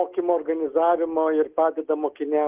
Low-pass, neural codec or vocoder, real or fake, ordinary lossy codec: 3.6 kHz; none; real; Opus, 16 kbps